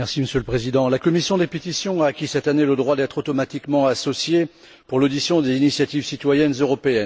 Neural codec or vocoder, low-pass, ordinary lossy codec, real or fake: none; none; none; real